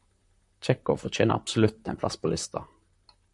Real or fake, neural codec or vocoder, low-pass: fake; vocoder, 44.1 kHz, 128 mel bands, Pupu-Vocoder; 10.8 kHz